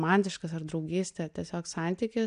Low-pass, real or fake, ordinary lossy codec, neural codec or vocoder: 9.9 kHz; real; AAC, 96 kbps; none